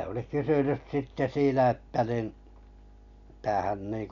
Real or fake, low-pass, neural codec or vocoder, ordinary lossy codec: real; 7.2 kHz; none; none